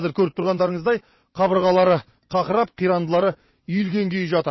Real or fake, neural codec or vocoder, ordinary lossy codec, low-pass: fake; vocoder, 22.05 kHz, 80 mel bands, WaveNeXt; MP3, 24 kbps; 7.2 kHz